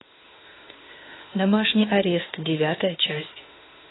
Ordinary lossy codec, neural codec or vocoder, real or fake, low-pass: AAC, 16 kbps; autoencoder, 48 kHz, 32 numbers a frame, DAC-VAE, trained on Japanese speech; fake; 7.2 kHz